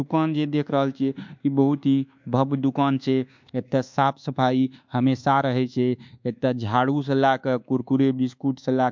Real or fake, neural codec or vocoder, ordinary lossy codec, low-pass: fake; codec, 24 kHz, 1.2 kbps, DualCodec; MP3, 64 kbps; 7.2 kHz